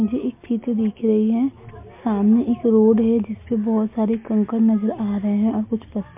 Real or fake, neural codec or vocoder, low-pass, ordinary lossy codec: real; none; 3.6 kHz; MP3, 32 kbps